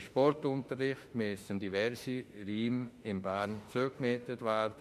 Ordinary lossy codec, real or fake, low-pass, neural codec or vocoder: MP3, 64 kbps; fake; 14.4 kHz; autoencoder, 48 kHz, 32 numbers a frame, DAC-VAE, trained on Japanese speech